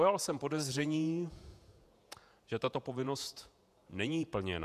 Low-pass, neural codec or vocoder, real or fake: 14.4 kHz; vocoder, 48 kHz, 128 mel bands, Vocos; fake